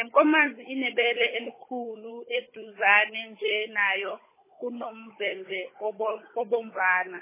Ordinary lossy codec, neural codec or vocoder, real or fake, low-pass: MP3, 16 kbps; codec, 16 kHz, 16 kbps, FunCodec, trained on Chinese and English, 50 frames a second; fake; 3.6 kHz